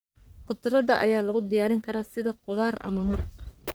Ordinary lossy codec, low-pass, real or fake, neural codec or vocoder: none; none; fake; codec, 44.1 kHz, 1.7 kbps, Pupu-Codec